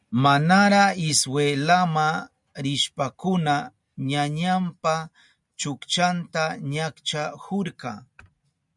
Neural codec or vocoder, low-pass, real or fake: none; 10.8 kHz; real